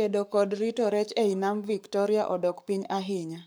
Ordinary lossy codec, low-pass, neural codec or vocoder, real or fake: none; none; codec, 44.1 kHz, 7.8 kbps, Pupu-Codec; fake